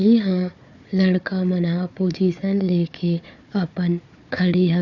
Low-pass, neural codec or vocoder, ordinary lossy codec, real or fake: 7.2 kHz; codec, 16 kHz, 4 kbps, FunCodec, trained on Chinese and English, 50 frames a second; none; fake